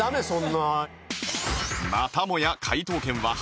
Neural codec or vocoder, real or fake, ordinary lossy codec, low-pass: none; real; none; none